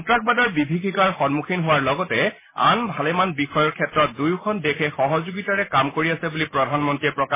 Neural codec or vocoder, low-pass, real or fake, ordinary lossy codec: none; 3.6 kHz; real; MP3, 16 kbps